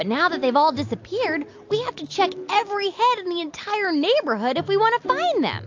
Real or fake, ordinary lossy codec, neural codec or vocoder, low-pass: real; AAC, 48 kbps; none; 7.2 kHz